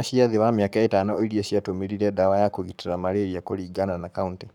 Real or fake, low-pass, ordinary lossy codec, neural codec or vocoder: fake; 19.8 kHz; none; codec, 44.1 kHz, 7.8 kbps, Pupu-Codec